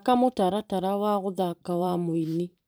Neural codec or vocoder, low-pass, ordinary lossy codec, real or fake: vocoder, 44.1 kHz, 128 mel bands every 512 samples, BigVGAN v2; none; none; fake